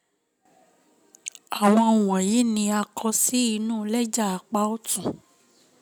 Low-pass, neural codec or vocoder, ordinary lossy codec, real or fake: none; none; none; real